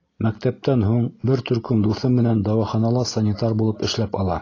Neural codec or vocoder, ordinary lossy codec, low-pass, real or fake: none; AAC, 32 kbps; 7.2 kHz; real